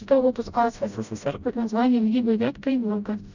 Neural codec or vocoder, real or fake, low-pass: codec, 16 kHz, 0.5 kbps, FreqCodec, smaller model; fake; 7.2 kHz